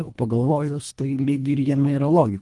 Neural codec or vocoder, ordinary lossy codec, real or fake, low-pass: codec, 24 kHz, 1.5 kbps, HILCodec; Opus, 32 kbps; fake; 10.8 kHz